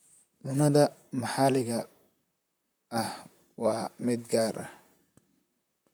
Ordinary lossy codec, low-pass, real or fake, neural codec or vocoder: none; none; fake; vocoder, 44.1 kHz, 128 mel bands, Pupu-Vocoder